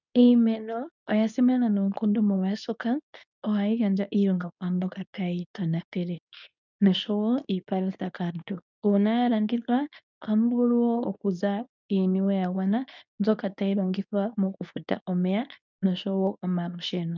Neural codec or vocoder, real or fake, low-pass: codec, 24 kHz, 0.9 kbps, WavTokenizer, medium speech release version 2; fake; 7.2 kHz